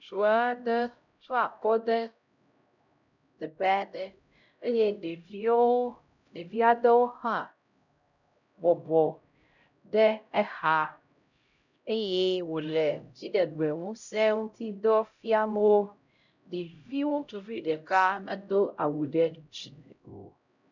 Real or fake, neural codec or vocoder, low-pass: fake; codec, 16 kHz, 0.5 kbps, X-Codec, HuBERT features, trained on LibriSpeech; 7.2 kHz